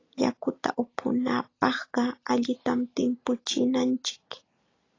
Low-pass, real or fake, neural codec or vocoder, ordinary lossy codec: 7.2 kHz; real; none; AAC, 32 kbps